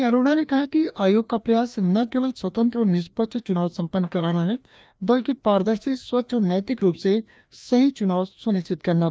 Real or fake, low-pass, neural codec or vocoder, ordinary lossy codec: fake; none; codec, 16 kHz, 1 kbps, FreqCodec, larger model; none